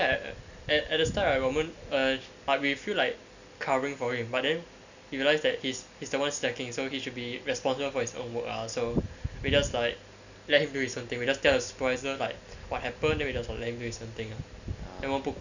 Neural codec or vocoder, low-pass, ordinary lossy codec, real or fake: none; 7.2 kHz; none; real